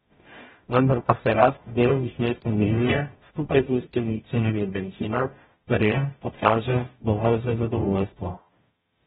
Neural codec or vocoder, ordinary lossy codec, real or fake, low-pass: codec, 44.1 kHz, 0.9 kbps, DAC; AAC, 16 kbps; fake; 19.8 kHz